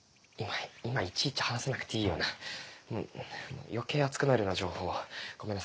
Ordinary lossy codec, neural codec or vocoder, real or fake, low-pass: none; none; real; none